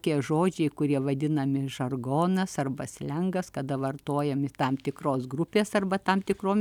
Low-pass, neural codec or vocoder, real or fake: 19.8 kHz; none; real